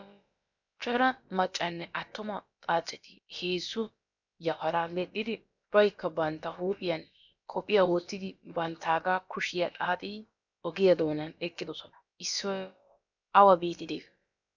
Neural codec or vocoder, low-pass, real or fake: codec, 16 kHz, about 1 kbps, DyCAST, with the encoder's durations; 7.2 kHz; fake